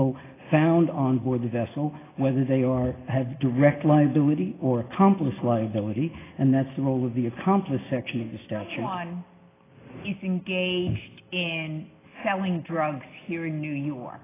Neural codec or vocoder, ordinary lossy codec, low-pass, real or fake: none; AAC, 16 kbps; 3.6 kHz; real